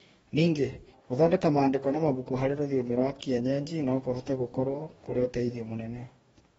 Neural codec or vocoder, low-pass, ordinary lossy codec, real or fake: codec, 44.1 kHz, 2.6 kbps, DAC; 19.8 kHz; AAC, 24 kbps; fake